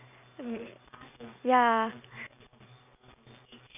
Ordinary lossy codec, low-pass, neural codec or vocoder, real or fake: none; 3.6 kHz; none; real